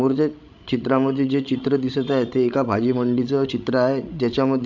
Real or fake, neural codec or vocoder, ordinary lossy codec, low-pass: fake; codec, 16 kHz, 16 kbps, FreqCodec, larger model; none; 7.2 kHz